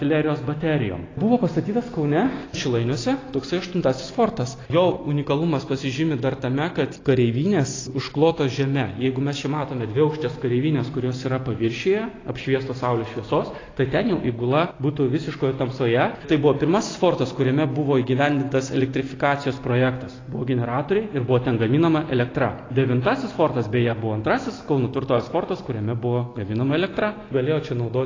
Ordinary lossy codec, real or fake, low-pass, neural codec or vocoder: AAC, 32 kbps; real; 7.2 kHz; none